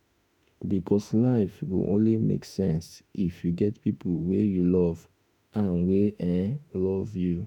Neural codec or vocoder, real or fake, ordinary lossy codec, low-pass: autoencoder, 48 kHz, 32 numbers a frame, DAC-VAE, trained on Japanese speech; fake; none; 19.8 kHz